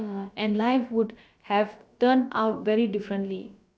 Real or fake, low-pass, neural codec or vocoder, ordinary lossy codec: fake; none; codec, 16 kHz, about 1 kbps, DyCAST, with the encoder's durations; none